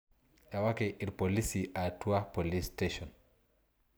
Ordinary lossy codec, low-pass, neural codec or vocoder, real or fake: none; none; none; real